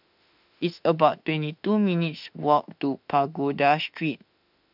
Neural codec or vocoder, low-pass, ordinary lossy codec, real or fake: autoencoder, 48 kHz, 32 numbers a frame, DAC-VAE, trained on Japanese speech; 5.4 kHz; none; fake